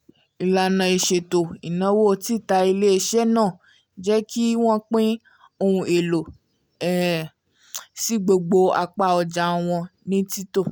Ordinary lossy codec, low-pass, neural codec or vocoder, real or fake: none; none; none; real